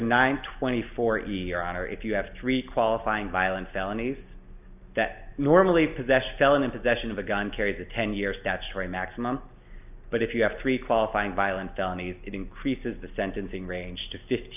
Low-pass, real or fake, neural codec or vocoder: 3.6 kHz; real; none